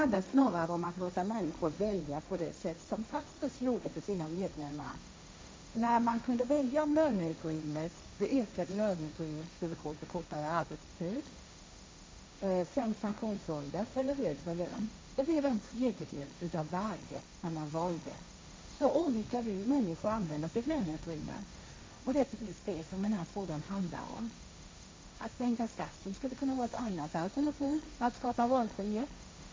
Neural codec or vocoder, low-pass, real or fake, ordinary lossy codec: codec, 16 kHz, 1.1 kbps, Voila-Tokenizer; none; fake; none